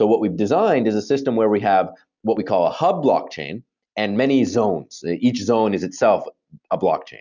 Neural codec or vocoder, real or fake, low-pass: none; real; 7.2 kHz